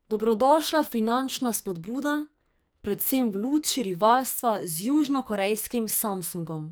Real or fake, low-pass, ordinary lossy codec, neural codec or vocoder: fake; none; none; codec, 44.1 kHz, 2.6 kbps, SNAC